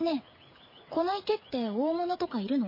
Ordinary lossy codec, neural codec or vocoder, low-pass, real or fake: MP3, 24 kbps; codec, 16 kHz, 8 kbps, FreqCodec, smaller model; 5.4 kHz; fake